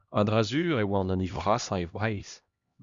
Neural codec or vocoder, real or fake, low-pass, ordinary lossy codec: codec, 16 kHz, 1 kbps, X-Codec, HuBERT features, trained on LibriSpeech; fake; 7.2 kHz; Opus, 64 kbps